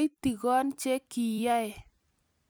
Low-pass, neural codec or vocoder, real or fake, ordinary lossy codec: none; vocoder, 44.1 kHz, 128 mel bands every 256 samples, BigVGAN v2; fake; none